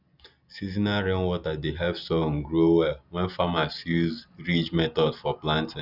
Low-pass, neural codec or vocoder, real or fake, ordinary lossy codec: 5.4 kHz; none; real; none